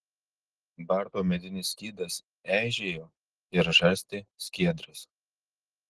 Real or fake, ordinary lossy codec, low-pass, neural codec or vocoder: real; Opus, 16 kbps; 10.8 kHz; none